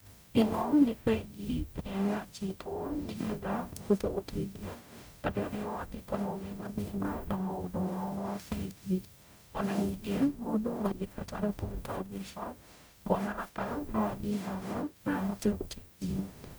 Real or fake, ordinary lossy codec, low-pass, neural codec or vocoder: fake; none; none; codec, 44.1 kHz, 0.9 kbps, DAC